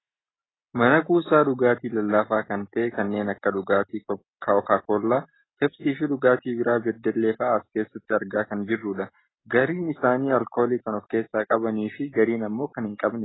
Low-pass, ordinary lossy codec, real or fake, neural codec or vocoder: 7.2 kHz; AAC, 16 kbps; real; none